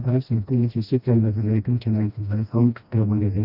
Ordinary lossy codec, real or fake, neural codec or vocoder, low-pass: none; fake; codec, 16 kHz, 1 kbps, FreqCodec, smaller model; 5.4 kHz